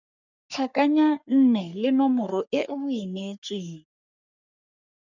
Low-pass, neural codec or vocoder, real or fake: 7.2 kHz; codec, 44.1 kHz, 3.4 kbps, Pupu-Codec; fake